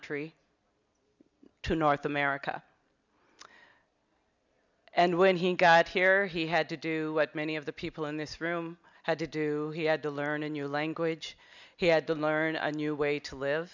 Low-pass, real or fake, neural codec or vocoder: 7.2 kHz; real; none